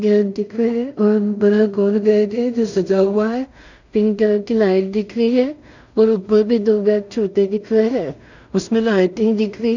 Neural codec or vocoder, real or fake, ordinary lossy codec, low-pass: codec, 16 kHz in and 24 kHz out, 0.4 kbps, LongCat-Audio-Codec, two codebook decoder; fake; none; 7.2 kHz